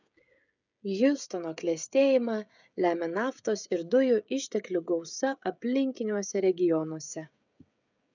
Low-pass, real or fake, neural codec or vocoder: 7.2 kHz; fake; codec, 16 kHz, 16 kbps, FreqCodec, smaller model